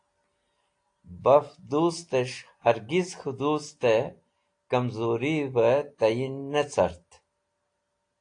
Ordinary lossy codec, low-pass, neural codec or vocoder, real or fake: AAC, 48 kbps; 9.9 kHz; none; real